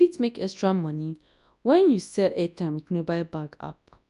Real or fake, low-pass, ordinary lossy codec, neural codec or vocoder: fake; 10.8 kHz; none; codec, 24 kHz, 0.9 kbps, WavTokenizer, large speech release